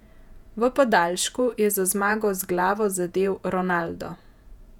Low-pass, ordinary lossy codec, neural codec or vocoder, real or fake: 19.8 kHz; none; vocoder, 48 kHz, 128 mel bands, Vocos; fake